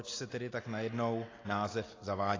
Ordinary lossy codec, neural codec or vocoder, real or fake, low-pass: AAC, 32 kbps; none; real; 7.2 kHz